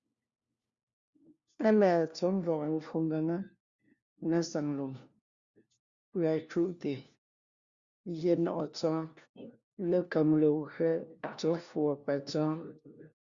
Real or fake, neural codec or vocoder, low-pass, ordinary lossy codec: fake; codec, 16 kHz, 1 kbps, FunCodec, trained on LibriTTS, 50 frames a second; 7.2 kHz; Opus, 64 kbps